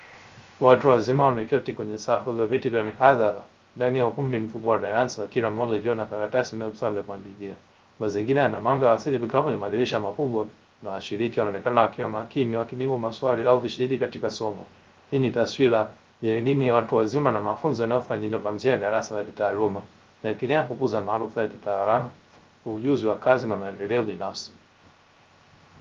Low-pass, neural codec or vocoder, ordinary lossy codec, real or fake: 7.2 kHz; codec, 16 kHz, 0.3 kbps, FocalCodec; Opus, 32 kbps; fake